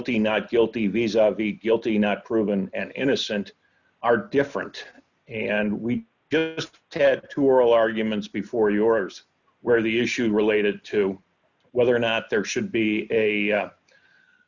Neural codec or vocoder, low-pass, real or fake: none; 7.2 kHz; real